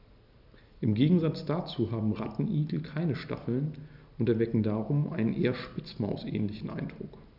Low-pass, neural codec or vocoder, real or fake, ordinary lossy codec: 5.4 kHz; none; real; none